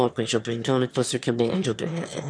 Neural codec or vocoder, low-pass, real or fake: autoencoder, 22.05 kHz, a latent of 192 numbers a frame, VITS, trained on one speaker; 9.9 kHz; fake